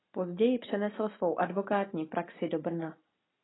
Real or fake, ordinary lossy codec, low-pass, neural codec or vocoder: real; AAC, 16 kbps; 7.2 kHz; none